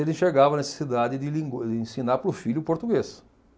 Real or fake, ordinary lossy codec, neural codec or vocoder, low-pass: real; none; none; none